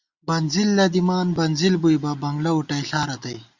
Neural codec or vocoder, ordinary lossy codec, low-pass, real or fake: none; Opus, 64 kbps; 7.2 kHz; real